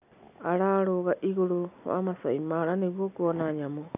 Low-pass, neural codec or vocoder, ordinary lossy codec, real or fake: 3.6 kHz; none; none; real